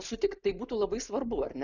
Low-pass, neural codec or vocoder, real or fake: 7.2 kHz; none; real